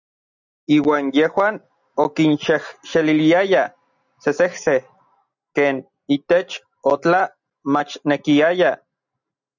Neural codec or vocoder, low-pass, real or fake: none; 7.2 kHz; real